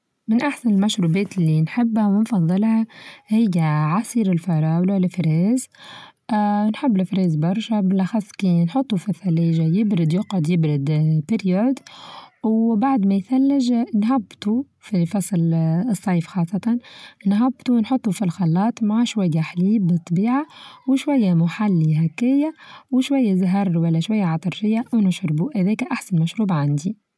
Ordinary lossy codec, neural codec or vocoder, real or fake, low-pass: none; none; real; none